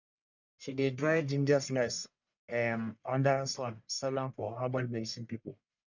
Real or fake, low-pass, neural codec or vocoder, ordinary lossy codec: fake; 7.2 kHz; codec, 44.1 kHz, 1.7 kbps, Pupu-Codec; none